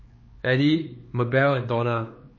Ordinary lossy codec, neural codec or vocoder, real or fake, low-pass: MP3, 32 kbps; codec, 16 kHz, 4 kbps, X-Codec, HuBERT features, trained on LibriSpeech; fake; 7.2 kHz